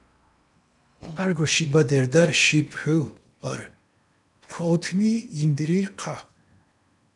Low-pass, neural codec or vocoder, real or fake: 10.8 kHz; codec, 16 kHz in and 24 kHz out, 0.8 kbps, FocalCodec, streaming, 65536 codes; fake